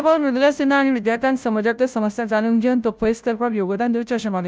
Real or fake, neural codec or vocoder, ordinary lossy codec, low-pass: fake; codec, 16 kHz, 0.5 kbps, FunCodec, trained on Chinese and English, 25 frames a second; none; none